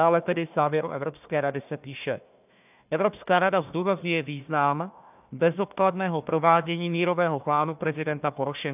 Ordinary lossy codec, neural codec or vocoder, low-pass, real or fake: AAC, 32 kbps; codec, 16 kHz, 1 kbps, FunCodec, trained on Chinese and English, 50 frames a second; 3.6 kHz; fake